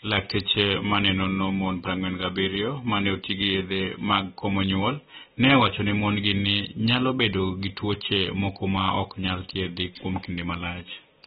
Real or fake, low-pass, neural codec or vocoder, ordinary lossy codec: real; 19.8 kHz; none; AAC, 16 kbps